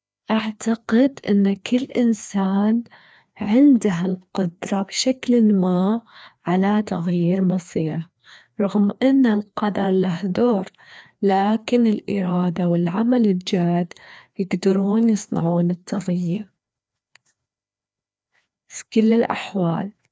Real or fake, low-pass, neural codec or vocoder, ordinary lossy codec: fake; none; codec, 16 kHz, 2 kbps, FreqCodec, larger model; none